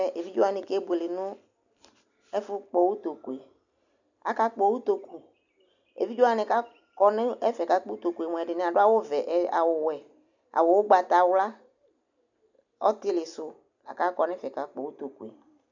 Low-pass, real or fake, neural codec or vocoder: 7.2 kHz; real; none